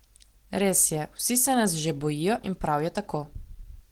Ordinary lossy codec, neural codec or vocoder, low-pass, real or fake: Opus, 16 kbps; none; 19.8 kHz; real